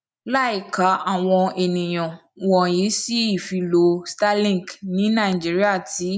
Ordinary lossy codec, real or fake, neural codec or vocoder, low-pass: none; real; none; none